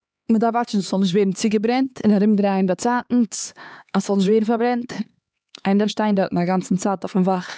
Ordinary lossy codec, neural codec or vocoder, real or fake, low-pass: none; codec, 16 kHz, 4 kbps, X-Codec, HuBERT features, trained on LibriSpeech; fake; none